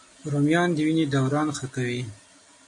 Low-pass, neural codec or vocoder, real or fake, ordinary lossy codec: 10.8 kHz; none; real; MP3, 96 kbps